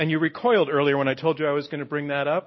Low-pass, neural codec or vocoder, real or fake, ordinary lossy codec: 7.2 kHz; none; real; MP3, 24 kbps